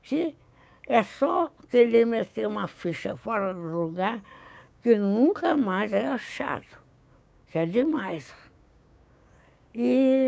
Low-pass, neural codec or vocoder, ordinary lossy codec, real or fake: none; codec, 16 kHz, 6 kbps, DAC; none; fake